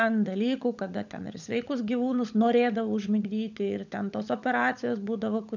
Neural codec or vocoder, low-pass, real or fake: codec, 16 kHz, 16 kbps, FunCodec, trained on LibriTTS, 50 frames a second; 7.2 kHz; fake